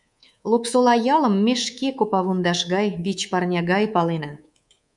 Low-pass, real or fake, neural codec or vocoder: 10.8 kHz; fake; codec, 24 kHz, 3.1 kbps, DualCodec